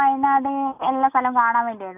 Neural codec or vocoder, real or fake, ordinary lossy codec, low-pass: none; real; none; 3.6 kHz